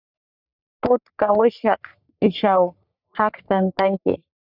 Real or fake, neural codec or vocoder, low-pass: fake; codec, 44.1 kHz, 3.4 kbps, Pupu-Codec; 5.4 kHz